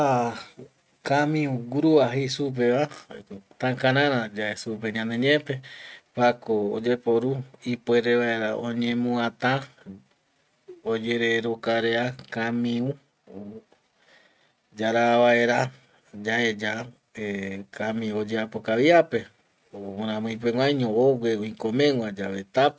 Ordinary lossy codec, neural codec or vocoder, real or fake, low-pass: none; none; real; none